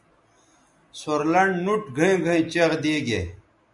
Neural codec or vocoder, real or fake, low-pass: none; real; 10.8 kHz